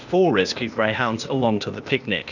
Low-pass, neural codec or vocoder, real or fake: 7.2 kHz; codec, 16 kHz, 0.8 kbps, ZipCodec; fake